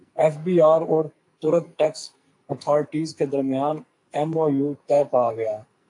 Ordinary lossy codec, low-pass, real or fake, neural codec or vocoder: AAC, 64 kbps; 10.8 kHz; fake; codec, 44.1 kHz, 2.6 kbps, SNAC